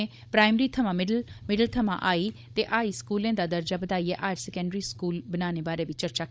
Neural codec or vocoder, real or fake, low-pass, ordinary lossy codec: codec, 16 kHz, 16 kbps, FunCodec, trained on Chinese and English, 50 frames a second; fake; none; none